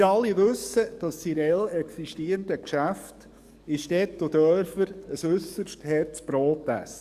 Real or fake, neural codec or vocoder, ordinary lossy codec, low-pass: fake; codec, 44.1 kHz, 7.8 kbps, DAC; Opus, 64 kbps; 14.4 kHz